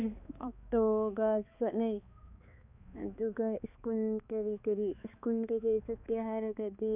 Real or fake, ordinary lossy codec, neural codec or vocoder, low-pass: fake; none; codec, 16 kHz, 2 kbps, X-Codec, HuBERT features, trained on balanced general audio; 3.6 kHz